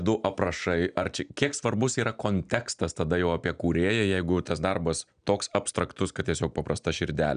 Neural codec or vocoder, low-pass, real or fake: none; 9.9 kHz; real